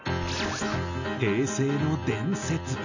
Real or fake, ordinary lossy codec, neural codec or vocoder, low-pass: real; none; none; 7.2 kHz